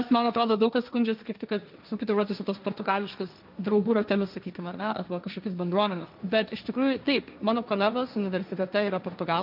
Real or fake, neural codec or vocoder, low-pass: fake; codec, 16 kHz, 1.1 kbps, Voila-Tokenizer; 5.4 kHz